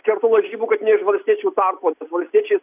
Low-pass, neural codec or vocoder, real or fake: 3.6 kHz; none; real